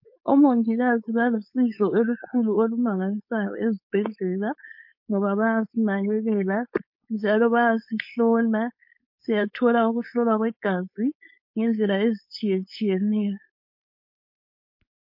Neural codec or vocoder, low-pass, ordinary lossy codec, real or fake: codec, 16 kHz, 4.8 kbps, FACodec; 5.4 kHz; MP3, 32 kbps; fake